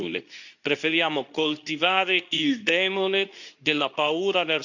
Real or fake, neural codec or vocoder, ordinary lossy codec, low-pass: fake; codec, 24 kHz, 0.9 kbps, WavTokenizer, medium speech release version 2; none; 7.2 kHz